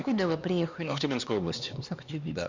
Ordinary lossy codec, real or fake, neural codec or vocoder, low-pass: Opus, 64 kbps; fake; codec, 16 kHz, 2 kbps, X-Codec, HuBERT features, trained on LibriSpeech; 7.2 kHz